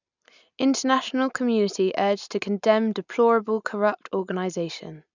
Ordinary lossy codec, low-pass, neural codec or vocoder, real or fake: none; 7.2 kHz; none; real